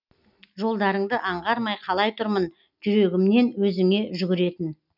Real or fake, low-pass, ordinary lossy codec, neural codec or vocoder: real; 5.4 kHz; none; none